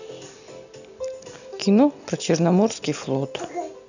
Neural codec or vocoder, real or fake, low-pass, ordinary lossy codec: none; real; 7.2 kHz; none